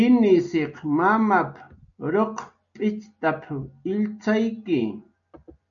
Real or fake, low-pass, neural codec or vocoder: real; 7.2 kHz; none